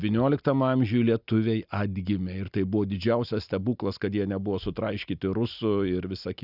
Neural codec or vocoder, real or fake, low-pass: none; real; 5.4 kHz